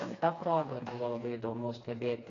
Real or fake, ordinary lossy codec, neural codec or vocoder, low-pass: fake; AAC, 32 kbps; codec, 16 kHz, 2 kbps, FreqCodec, smaller model; 7.2 kHz